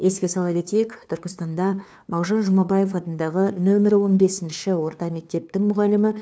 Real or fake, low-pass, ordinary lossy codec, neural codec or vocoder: fake; none; none; codec, 16 kHz, 2 kbps, FunCodec, trained on LibriTTS, 25 frames a second